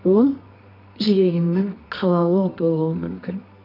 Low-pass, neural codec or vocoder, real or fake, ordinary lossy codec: 5.4 kHz; codec, 24 kHz, 0.9 kbps, WavTokenizer, medium music audio release; fake; AAC, 32 kbps